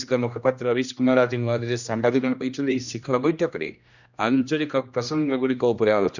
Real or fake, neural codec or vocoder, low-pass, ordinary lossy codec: fake; codec, 16 kHz, 1 kbps, X-Codec, HuBERT features, trained on general audio; 7.2 kHz; none